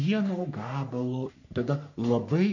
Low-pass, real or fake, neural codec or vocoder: 7.2 kHz; fake; codec, 44.1 kHz, 3.4 kbps, Pupu-Codec